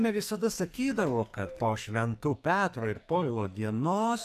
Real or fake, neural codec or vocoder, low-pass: fake; codec, 44.1 kHz, 2.6 kbps, SNAC; 14.4 kHz